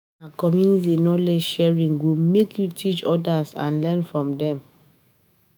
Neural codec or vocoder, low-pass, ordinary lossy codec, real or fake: autoencoder, 48 kHz, 128 numbers a frame, DAC-VAE, trained on Japanese speech; none; none; fake